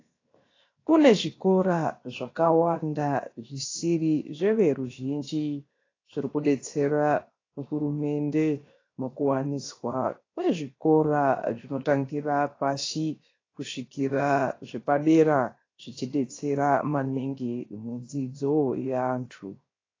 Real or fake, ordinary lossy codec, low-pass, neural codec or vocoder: fake; AAC, 32 kbps; 7.2 kHz; codec, 16 kHz, 0.7 kbps, FocalCodec